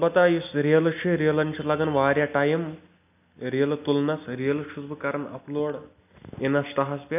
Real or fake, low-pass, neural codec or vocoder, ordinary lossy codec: real; 3.6 kHz; none; none